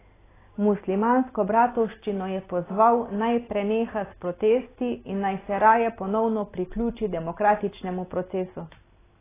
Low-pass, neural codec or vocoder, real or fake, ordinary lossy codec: 3.6 kHz; none; real; AAC, 16 kbps